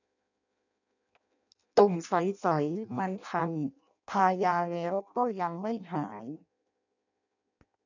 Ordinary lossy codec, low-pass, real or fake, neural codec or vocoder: none; 7.2 kHz; fake; codec, 16 kHz in and 24 kHz out, 0.6 kbps, FireRedTTS-2 codec